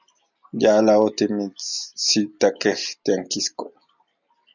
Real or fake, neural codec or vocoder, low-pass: real; none; 7.2 kHz